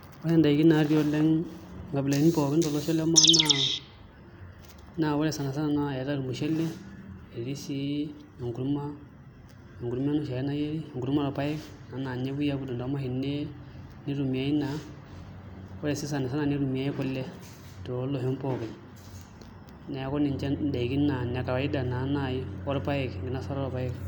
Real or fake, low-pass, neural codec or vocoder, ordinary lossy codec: real; none; none; none